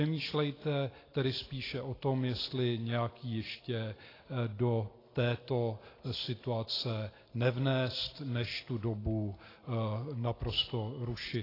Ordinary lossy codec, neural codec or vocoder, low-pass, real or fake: AAC, 24 kbps; none; 5.4 kHz; real